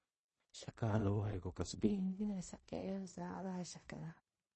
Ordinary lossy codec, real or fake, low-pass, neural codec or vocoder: MP3, 32 kbps; fake; 10.8 kHz; codec, 16 kHz in and 24 kHz out, 0.4 kbps, LongCat-Audio-Codec, two codebook decoder